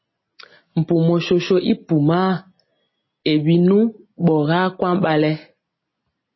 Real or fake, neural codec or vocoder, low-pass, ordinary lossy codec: real; none; 7.2 kHz; MP3, 24 kbps